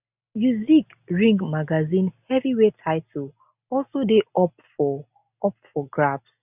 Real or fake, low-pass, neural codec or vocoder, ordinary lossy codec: real; 3.6 kHz; none; none